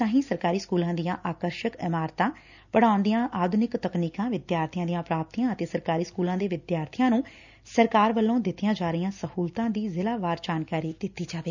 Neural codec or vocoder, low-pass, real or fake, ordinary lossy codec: none; 7.2 kHz; real; none